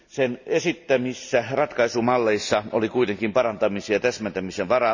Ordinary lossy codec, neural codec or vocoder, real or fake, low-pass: none; none; real; 7.2 kHz